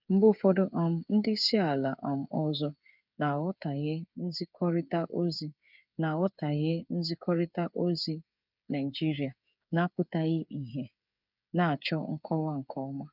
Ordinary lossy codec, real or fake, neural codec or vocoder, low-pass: none; fake; codec, 16 kHz, 8 kbps, FreqCodec, smaller model; 5.4 kHz